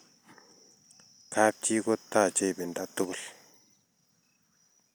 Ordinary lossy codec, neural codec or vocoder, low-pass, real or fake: none; none; none; real